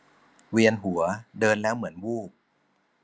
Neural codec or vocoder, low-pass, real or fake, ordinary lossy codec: none; none; real; none